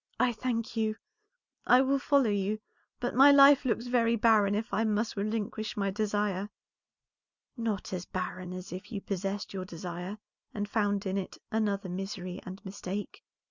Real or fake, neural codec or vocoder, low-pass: real; none; 7.2 kHz